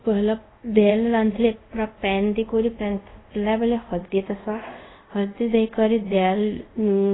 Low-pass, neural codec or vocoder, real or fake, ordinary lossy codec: 7.2 kHz; codec, 24 kHz, 0.5 kbps, DualCodec; fake; AAC, 16 kbps